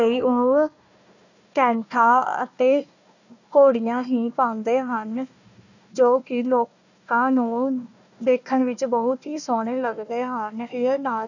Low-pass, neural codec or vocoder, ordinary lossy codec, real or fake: 7.2 kHz; codec, 16 kHz, 1 kbps, FunCodec, trained on Chinese and English, 50 frames a second; none; fake